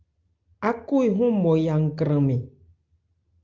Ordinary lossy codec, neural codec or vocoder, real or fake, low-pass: Opus, 32 kbps; none; real; 7.2 kHz